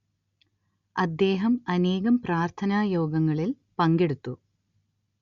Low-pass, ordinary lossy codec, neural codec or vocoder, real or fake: 7.2 kHz; Opus, 64 kbps; none; real